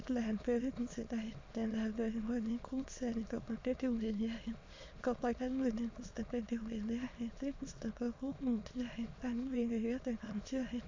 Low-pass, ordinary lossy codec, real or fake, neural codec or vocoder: 7.2 kHz; MP3, 48 kbps; fake; autoencoder, 22.05 kHz, a latent of 192 numbers a frame, VITS, trained on many speakers